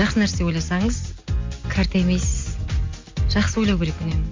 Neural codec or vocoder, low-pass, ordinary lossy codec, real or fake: none; 7.2 kHz; MP3, 48 kbps; real